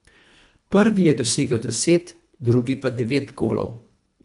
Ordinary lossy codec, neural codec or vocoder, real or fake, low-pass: none; codec, 24 kHz, 1.5 kbps, HILCodec; fake; 10.8 kHz